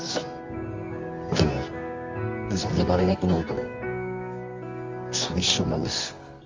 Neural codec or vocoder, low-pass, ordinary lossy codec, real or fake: codec, 24 kHz, 0.9 kbps, WavTokenizer, medium music audio release; 7.2 kHz; Opus, 32 kbps; fake